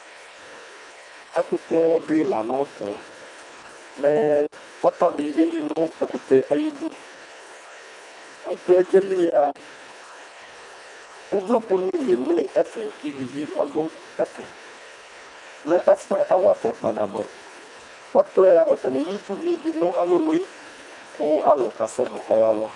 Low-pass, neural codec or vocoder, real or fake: 10.8 kHz; codec, 24 kHz, 1.5 kbps, HILCodec; fake